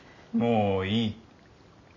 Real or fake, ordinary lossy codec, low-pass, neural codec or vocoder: real; none; 7.2 kHz; none